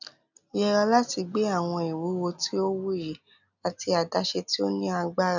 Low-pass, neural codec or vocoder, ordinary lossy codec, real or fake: 7.2 kHz; none; none; real